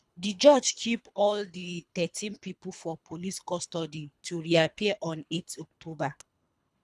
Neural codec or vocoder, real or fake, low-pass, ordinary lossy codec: codec, 24 kHz, 3 kbps, HILCodec; fake; none; none